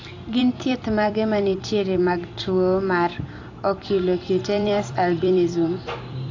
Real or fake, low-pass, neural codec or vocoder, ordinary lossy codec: fake; 7.2 kHz; vocoder, 44.1 kHz, 128 mel bands every 256 samples, BigVGAN v2; AAC, 48 kbps